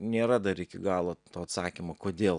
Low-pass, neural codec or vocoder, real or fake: 9.9 kHz; none; real